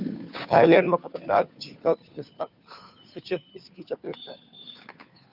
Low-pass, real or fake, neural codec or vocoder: 5.4 kHz; fake; codec, 16 kHz, 2 kbps, FunCodec, trained on Chinese and English, 25 frames a second